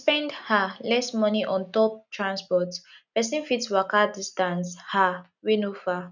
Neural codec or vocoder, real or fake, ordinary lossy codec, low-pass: none; real; none; 7.2 kHz